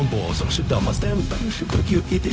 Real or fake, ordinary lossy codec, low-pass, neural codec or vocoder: fake; none; none; codec, 16 kHz, 0.4 kbps, LongCat-Audio-Codec